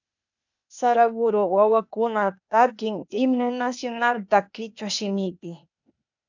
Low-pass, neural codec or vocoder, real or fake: 7.2 kHz; codec, 16 kHz, 0.8 kbps, ZipCodec; fake